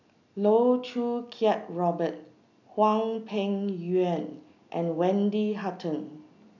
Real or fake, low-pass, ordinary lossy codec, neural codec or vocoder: real; 7.2 kHz; none; none